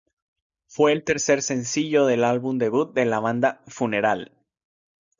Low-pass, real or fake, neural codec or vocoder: 7.2 kHz; real; none